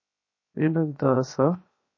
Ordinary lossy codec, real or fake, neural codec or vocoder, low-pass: MP3, 32 kbps; fake; codec, 16 kHz, 0.7 kbps, FocalCodec; 7.2 kHz